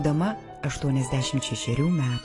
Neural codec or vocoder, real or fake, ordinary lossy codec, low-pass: none; real; AAC, 32 kbps; 10.8 kHz